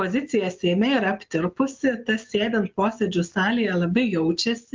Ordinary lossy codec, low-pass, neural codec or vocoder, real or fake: Opus, 24 kbps; 7.2 kHz; none; real